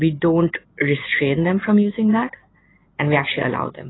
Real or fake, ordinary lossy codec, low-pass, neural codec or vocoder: real; AAC, 16 kbps; 7.2 kHz; none